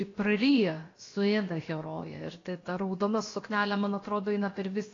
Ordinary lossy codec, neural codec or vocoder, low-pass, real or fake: AAC, 32 kbps; codec, 16 kHz, about 1 kbps, DyCAST, with the encoder's durations; 7.2 kHz; fake